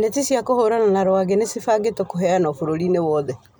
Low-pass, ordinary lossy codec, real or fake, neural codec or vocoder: none; none; real; none